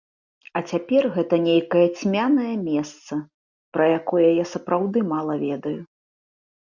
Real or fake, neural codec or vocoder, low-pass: real; none; 7.2 kHz